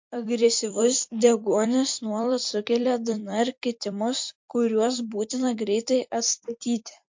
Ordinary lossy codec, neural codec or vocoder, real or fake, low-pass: MP3, 48 kbps; vocoder, 44.1 kHz, 128 mel bands every 256 samples, BigVGAN v2; fake; 7.2 kHz